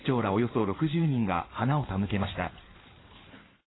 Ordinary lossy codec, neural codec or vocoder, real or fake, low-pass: AAC, 16 kbps; codec, 16 kHz in and 24 kHz out, 2.2 kbps, FireRedTTS-2 codec; fake; 7.2 kHz